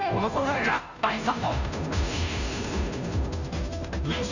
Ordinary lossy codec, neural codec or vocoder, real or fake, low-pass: none; codec, 16 kHz, 0.5 kbps, FunCodec, trained on Chinese and English, 25 frames a second; fake; 7.2 kHz